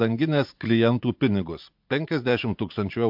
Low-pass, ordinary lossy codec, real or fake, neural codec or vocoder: 5.4 kHz; MP3, 48 kbps; fake; vocoder, 44.1 kHz, 80 mel bands, Vocos